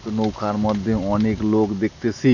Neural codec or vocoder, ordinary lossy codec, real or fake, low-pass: none; none; real; 7.2 kHz